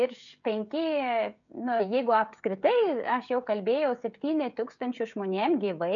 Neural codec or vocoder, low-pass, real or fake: codec, 16 kHz, 16 kbps, FreqCodec, smaller model; 7.2 kHz; fake